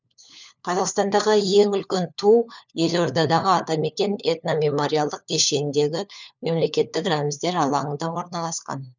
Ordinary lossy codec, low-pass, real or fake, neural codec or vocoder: none; 7.2 kHz; fake; codec, 16 kHz, 4 kbps, FunCodec, trained on LibriTTS, 50 frames a second